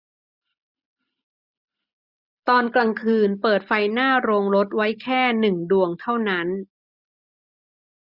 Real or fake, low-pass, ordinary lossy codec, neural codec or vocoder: real; 5.4 kHz; none; none